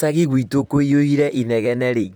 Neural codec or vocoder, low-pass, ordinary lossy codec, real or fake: vocoder, 44.1 kHz, 128 mel bands, Pupu-Vocoder; none; none; fake